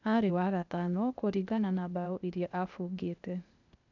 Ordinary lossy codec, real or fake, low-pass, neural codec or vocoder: none; fake; 7.2 kHz; codec, 16 kHz, 0.8 kbps, ZipCodec